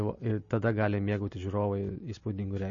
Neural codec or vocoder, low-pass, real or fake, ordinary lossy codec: none; 7.2 kHz; real; MP3, 32 kbps